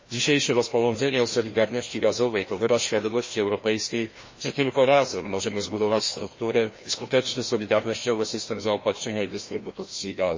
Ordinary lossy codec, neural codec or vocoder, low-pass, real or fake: MP3, 32 kbps; codec, 16 kHz, 1 kbps, FreqCodec, larger model; 7.2 kHz; fake